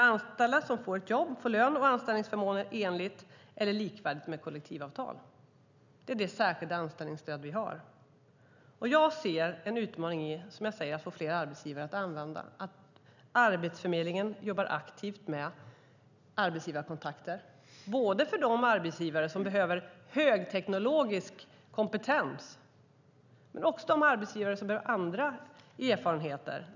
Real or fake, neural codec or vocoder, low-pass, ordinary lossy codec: real; none; 7.2 kHz; none